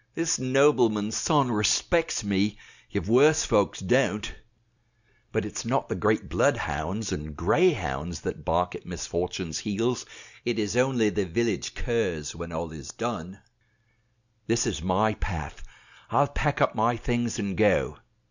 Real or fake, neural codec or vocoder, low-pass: real; none; 7.2 kHz